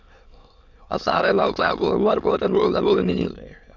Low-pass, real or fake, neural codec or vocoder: 7.2 kHz; fake; autoencoder, 22.05 kHz, a latent of 192 numbers a frame, VITS, trained on many speakers